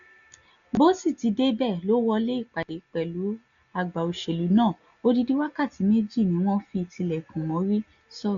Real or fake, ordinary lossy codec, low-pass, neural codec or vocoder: real; none; 7.2 kHz; none